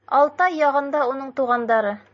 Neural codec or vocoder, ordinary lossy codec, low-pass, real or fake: none; MP3, 32 kbps; 7.2 kHz; real